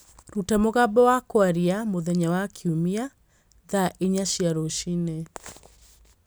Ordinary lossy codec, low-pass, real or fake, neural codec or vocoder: none; none; real; none